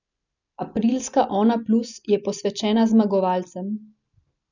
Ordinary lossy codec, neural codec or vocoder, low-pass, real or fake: none; none; 7.2 kHz; real